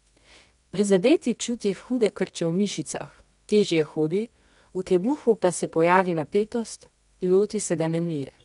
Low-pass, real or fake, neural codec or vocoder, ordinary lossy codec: 10.8 kHz; fake; codec, 24 kHz, 0.9 kbps, WavTokenizer, medium music audio release; MP3, 96 kbps